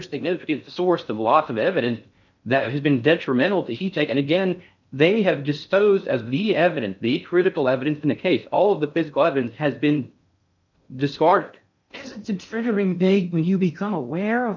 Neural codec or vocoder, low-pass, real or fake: codec, 16 kHz in and 24 kHz out, 0.6 kbps, FocalCodec, streaming, 4096 codes; 7.2 kHz; fake